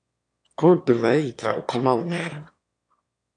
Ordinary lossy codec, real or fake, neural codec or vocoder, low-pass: MP3, 96 kbps; fake; autoencoder, 22.05 kHz, a latent of 192 numbers a frame, VITS, trained on one speaker; 9.9 kHz